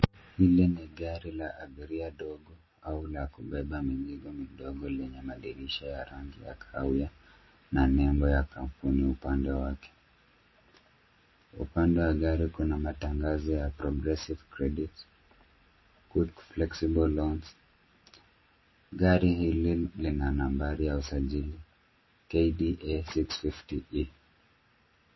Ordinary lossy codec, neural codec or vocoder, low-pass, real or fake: MP3, 24 kbps; none; 7.2 kHz; real